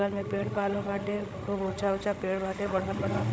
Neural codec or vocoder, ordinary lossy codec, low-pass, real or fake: codec, 16 kHz, 16 kbps, FreqCodec, larger model; none; none; fake